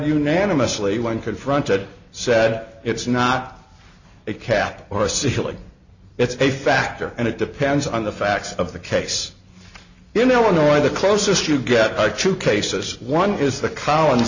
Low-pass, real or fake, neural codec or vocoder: 7.2 kHz; real; none